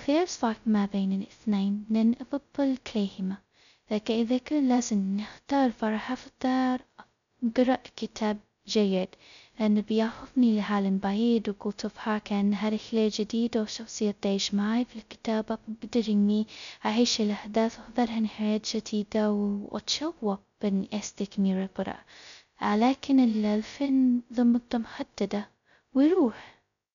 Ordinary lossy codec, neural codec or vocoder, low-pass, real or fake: none; codec, 16 kHz, 0.2 kbps, FocalCodec; 7.2 kHz; fake